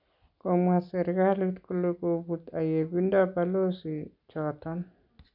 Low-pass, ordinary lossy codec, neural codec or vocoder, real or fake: 5.4 kHz; none; none; real